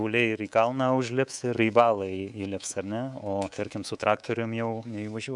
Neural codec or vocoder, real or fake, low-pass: codec, 24 kHz, 3.1 kbps, DualCodec; fake; 10.8 kHz